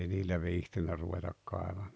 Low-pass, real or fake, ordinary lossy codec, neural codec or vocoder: none; real; none; none